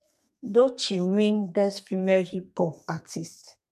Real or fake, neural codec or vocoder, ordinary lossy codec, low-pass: fake; codec, 32 kHz, 1.9 kbps, SNAC; MP3, 96 kbps; 14.4 kHz